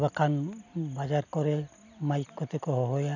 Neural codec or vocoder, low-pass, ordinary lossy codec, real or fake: none; 7.2 kHz; none; real